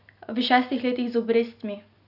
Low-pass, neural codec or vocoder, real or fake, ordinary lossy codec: 5.4 kHz; none; real; none